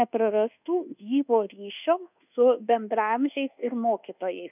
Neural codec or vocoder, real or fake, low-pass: codec, 24 kHz, 1.2 kbps, DualCodec; fake; 3.6 kHz